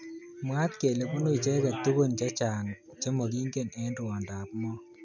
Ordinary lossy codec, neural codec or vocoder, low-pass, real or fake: none; none; 7.2 kHz; real